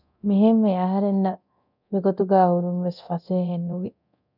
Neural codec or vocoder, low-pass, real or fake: codec, 24 kHz, 0.9 kbps, DualCodec; 5.4 kHz; fake